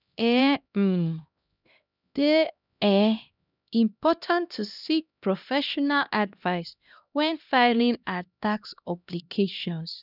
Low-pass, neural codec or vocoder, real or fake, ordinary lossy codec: 5.4 kHz; codec, 16 kHz, 1 kbps, X-Codec, HuBERT features, trained on LibriSpeech; fake; none